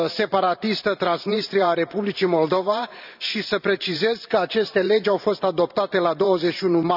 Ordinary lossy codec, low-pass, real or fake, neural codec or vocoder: none; 5.4 kHz; fake; vocoder, 44.1 kHz, 128 mel bands every 512 samples, BigVGAN v2